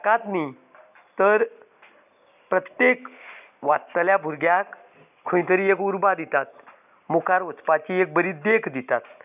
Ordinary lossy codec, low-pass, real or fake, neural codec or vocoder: none; 3.6 kHz; real; none